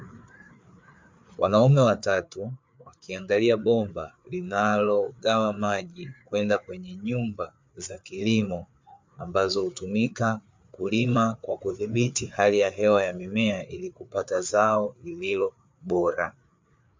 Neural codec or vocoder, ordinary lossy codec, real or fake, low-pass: codec, 16 kHz, 4 kbps, FreqCodec, larger model; MP3, 64 kbps; fake; 7.2 kHz